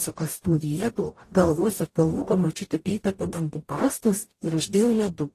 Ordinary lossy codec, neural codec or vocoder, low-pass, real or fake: AAC, 48 kbps; codec, 44.1 kHz, 0.9 kbps, DAC; 14.4 kHz; fake